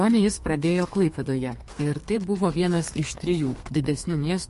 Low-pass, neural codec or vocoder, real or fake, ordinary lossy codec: 14.4 kHz; codec, 32 kHz, 1.9 kbps, SNAC; fake; MP3, 48 kbps